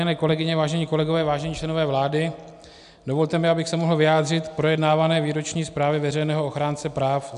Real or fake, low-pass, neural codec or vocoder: real; 10.8 kHz; none